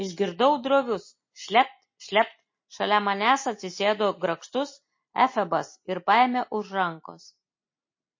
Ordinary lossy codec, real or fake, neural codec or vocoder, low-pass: MP3, 32 kbps; real; none; 7.2 kHz